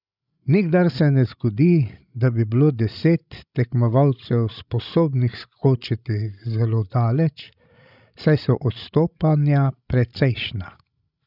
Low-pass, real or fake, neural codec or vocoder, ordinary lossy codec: 5.4 kHz; fake; codec, 16 kHz, 16 kbps, FreqCodec, larger model; none